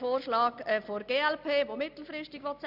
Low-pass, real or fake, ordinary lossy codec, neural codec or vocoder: 5.4 kHz; real; none; none